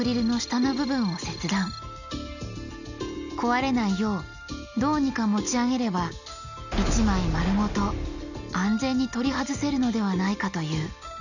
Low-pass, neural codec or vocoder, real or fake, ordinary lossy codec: 7.2 kHz; none; real; none